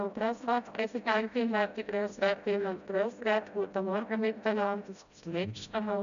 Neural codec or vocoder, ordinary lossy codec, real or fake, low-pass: codec, 16 kHz, 0.5 kbps, FreqCodec, smaller model; none; fake; 7.2 kHz